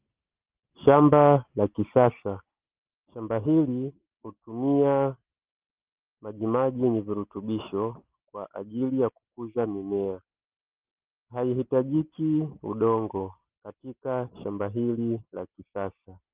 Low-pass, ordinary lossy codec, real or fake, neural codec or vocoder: 3.6 kHz; Opus, 32 kbps; real; none